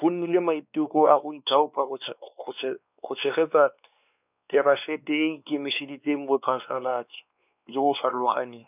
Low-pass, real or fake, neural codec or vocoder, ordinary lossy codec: 3.6 kHz; fake; codec, 16 kHz, 2 kbps, X-Codec, WavLM features, trained on Multilingual LibriSpeech; none